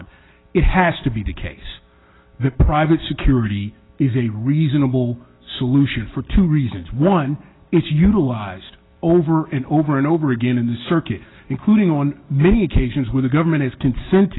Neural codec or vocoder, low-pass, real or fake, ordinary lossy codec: none; 7.2 kHz; real; AAC, 16 kbps